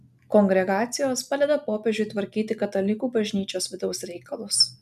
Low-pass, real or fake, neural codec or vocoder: 14.4 kHz; real; none